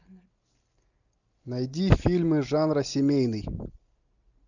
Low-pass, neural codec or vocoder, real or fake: 7.2 kHz; none; real